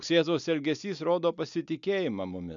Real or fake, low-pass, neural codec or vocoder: real; 7.2 kHz; none